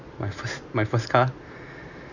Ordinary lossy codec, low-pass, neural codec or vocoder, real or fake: none; 7.2 kHz; none; real